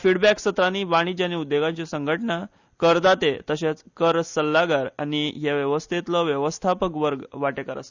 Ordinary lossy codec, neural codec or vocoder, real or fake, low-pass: Opus, 64 kbps; none; real; 7.2 kHz